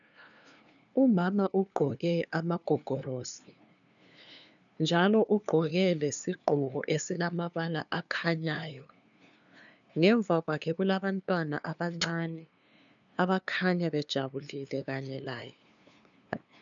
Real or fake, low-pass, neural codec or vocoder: fake; 7.2 kHz; codec, 16 kHz, 2 kbps, FunCodec, trained on LibriTTS, 25 frames a second